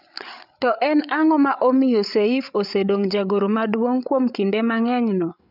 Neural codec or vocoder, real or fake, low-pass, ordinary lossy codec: codec, 16 kHz, 8 kbps, FreqCodec, larger model; fake; 5.4 kHz; none